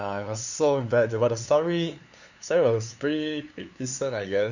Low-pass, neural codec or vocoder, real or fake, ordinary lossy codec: 7.2 kHz; codec, 16 kHz, 2 kbps, FunCodec, trained on LibriTTS, 25 frames a second; fake; none